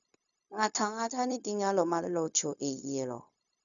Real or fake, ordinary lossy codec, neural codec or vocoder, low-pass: fake; none; codec, 16 kHz, 0.4 kbps, LongCat-Audio-Codec; 7.2 kHz